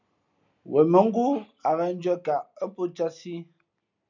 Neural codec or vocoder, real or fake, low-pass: none; real; 7.2 kHz